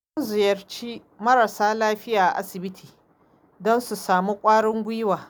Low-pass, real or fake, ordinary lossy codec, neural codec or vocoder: none; real; none; none